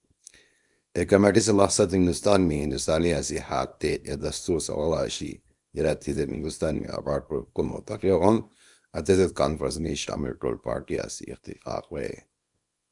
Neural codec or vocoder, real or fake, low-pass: codec, 24 kHz, 0.9 kbps, WavTokenizer, small release; fake; 10.8 kHz